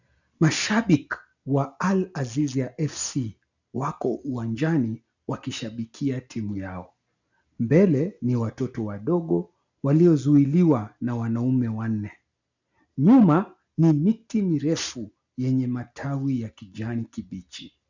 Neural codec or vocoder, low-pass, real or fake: none; 7.2 kHz; real